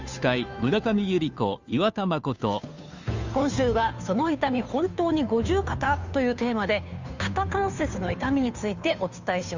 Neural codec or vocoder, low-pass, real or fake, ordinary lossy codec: codec, 16 kHz, 2 kbps, FunCodec, trained on Chinese and English, 25 frames a second; 7.2 kHz; fake; Opus, 64 kbps